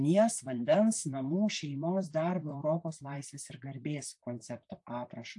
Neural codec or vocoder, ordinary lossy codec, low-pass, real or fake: vocoder, 44.1 kHz, 128 mel bands, Pupu-Vocoder; AAC, 64 kbps; 10.8 kHz; fake